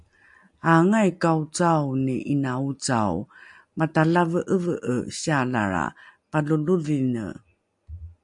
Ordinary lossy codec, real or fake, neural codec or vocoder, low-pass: MP3, 64 kbps; real; none; 10.8 kHz